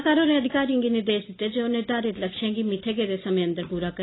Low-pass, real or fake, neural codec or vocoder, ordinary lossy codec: 7.2 kHz; real; none; AAC, 16 kbps